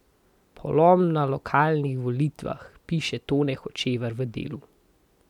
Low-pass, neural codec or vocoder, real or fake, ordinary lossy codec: 19.8 kHz; none; real; none